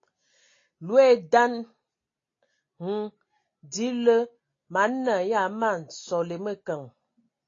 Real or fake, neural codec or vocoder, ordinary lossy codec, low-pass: real; none; AAC, 32 kbps; 7.2 kHz